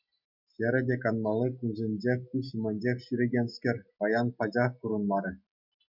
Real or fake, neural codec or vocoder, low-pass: real; none; 5.4 kHz